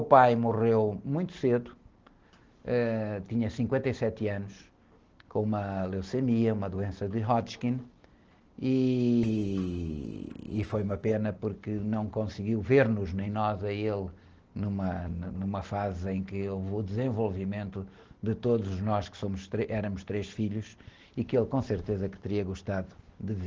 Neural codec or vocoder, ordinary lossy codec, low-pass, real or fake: none; Opus, 16 kbps; 7.2 kHz; real